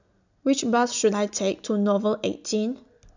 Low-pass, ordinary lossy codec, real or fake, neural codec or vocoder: 7.2 kHz; none; real; none